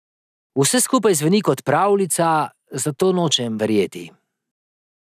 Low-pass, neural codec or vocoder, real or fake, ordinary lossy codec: 14.4 kHz; none; real; none